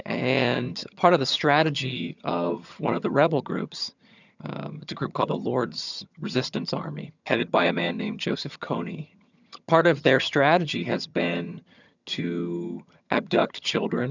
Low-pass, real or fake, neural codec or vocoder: 7.2 kHz; fake; vocoder, 22.05 kHz, 80 mel bands, HiFi-GAN